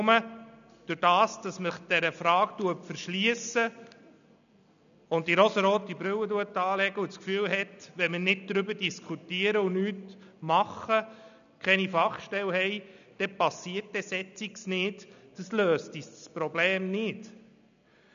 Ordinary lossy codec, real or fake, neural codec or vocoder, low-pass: none; real; none; 7.2 kHz